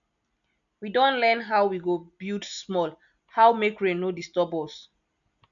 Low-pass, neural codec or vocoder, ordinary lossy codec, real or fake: 7.2 kHz; none; none; real